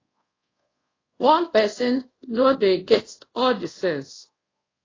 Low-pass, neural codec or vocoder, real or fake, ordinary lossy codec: 7.2 kHz; codec, 24 kHz, 0.5 kbps, DualCodec; fake; AAC, 32 kbps